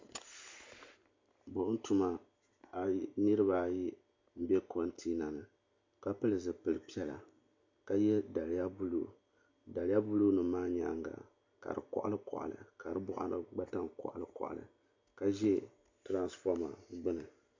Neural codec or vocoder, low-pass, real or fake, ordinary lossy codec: none; 7.2 kHz; real; MP3, 48 kbps